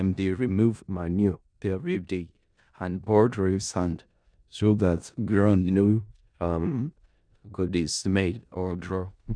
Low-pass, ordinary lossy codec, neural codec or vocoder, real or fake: 9.9 kHz; none; codec, 16 kHz in and 24 kHz out, 0.4 kbps, LongCat-Audio-Codec, four codebook decoder; fake